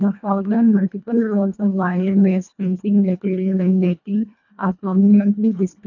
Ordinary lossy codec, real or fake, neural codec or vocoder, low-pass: none; fake; codec, 24 kHz, 1.5 kbps, HILCodec; 7.2 kHz